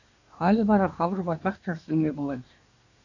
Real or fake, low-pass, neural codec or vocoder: fake; 7.2 kHz; codec, 24 kHz, 1 kbps, SNAC